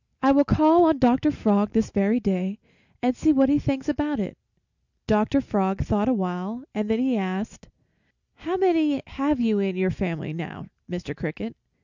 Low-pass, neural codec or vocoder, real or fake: 7.2 kHz; none; real